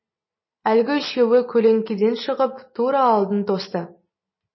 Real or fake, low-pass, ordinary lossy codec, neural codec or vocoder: real; 7.2 kHz; MP3, 24 kbps; none